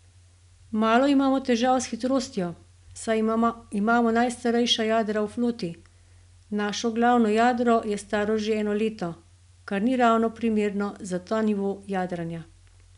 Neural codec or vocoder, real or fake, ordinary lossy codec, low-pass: none; real; none; 10.8 kHz